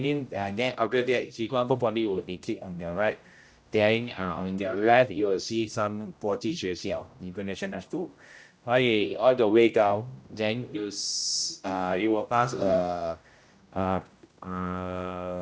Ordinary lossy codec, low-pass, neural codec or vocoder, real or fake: none; none; codec, 16 kHz, 0.5 kbps, X-Codec, HuBERT features, trained on general audio; fake